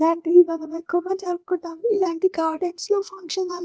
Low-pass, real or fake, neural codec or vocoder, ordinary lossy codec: none; fake; codec, 16 kHz, 0.8 kbps, ZipCodec; none